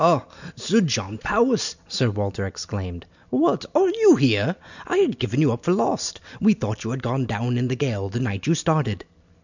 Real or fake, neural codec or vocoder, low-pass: real; none; 7.2 kHz